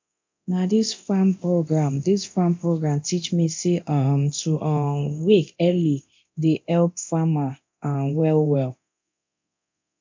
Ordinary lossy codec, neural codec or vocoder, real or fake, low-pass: MP3, 64 kbps; codec, 24 kHz, 0.9 kbps, DualCodec; fake; 7.2 kHz